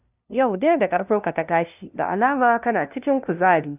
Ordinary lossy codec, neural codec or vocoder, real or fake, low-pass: none; codec, 16 kHz, 0.5 kbps, FunCodec, trained on LibriTTS, 25 frames a second; fake; 3.6 kHz